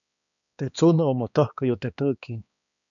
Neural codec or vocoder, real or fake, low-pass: codec, 16 kHz, 2 kbps, X-Codec, HuBERT features, trained on balanced general audio; fake; 7.2 kHz